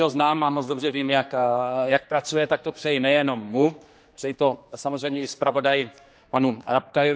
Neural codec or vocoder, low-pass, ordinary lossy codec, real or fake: codec, 16 kHz, 2 kbps, X-Codec, HuBERT features, trained on general audio; none; none; fake